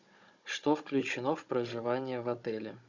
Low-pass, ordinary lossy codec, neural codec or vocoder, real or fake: 7.2 kHz; Opus, 64 kbps; codec, 16 kHz, 16 kbps, FunCodec, trained on Chinese and English, 50 frames a second; fake